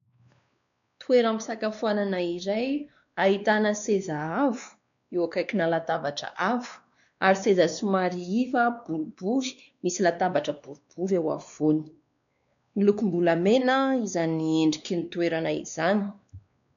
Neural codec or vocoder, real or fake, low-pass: codec, 16 kHz, 2 kbps, X-Codec, WavLM features, trained on Multilingual LibriSpeech; fake; 7.2 kHz